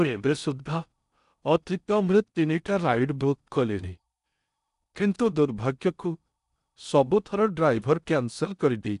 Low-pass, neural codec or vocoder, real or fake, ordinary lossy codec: 10.8 kHz; codec, 16 kHz in and 24 kHz out, 0.6 kbps, FocalCodec, streaming, 4096 codes; fake; none